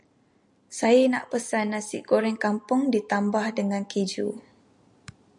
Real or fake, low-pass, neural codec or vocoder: real; 10.8 kHz; none